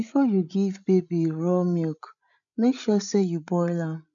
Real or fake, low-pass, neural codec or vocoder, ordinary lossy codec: fake; 7.2 kHz; codec, 16 kHz, 16 kbps, FreqCodec, larger model; none